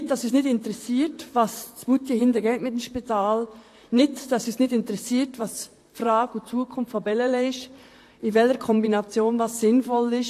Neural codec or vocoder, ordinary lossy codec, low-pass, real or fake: vocoder, 44.1 kHz, 128 mel bands, Pupu-Vocoder; AAC, 64 kbps; 14.4 kHz; fake